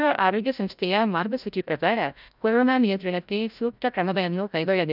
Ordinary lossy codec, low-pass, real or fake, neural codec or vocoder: none; 5.4 kHz; fake; codec, 16 kHz, 0.5 kbps, FreqCodec, larger model